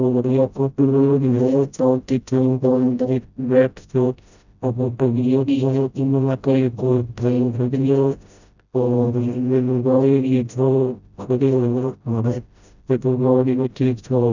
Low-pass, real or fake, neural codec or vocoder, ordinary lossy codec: 7.2 kHz; fake; codec, 16 kHz, 0.5 kbps, FreqCodec, smaller model; none